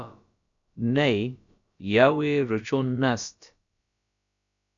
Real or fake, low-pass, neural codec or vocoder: fake; 7.2 kHz; codec, 16 kHz, about 1 kbps, DyCAST, with the encoder's durations